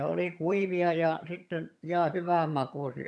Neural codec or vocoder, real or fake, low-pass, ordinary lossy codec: vocoder, 22.05 kHz, 80 mel bands, HiFi-GAN; fake; none; none